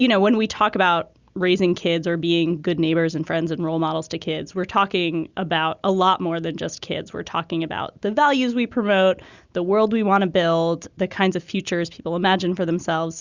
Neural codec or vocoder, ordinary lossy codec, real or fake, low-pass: none; Opus, 64 kbps; real; 7.2 kHz